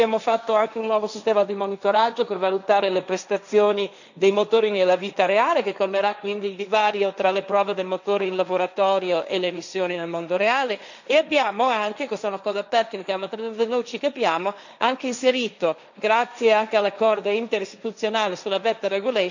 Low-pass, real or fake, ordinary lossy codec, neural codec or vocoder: 7.2 kHz; fake; none; codec, 16 kHz, 1.1 kbps, Voila-Tokenizer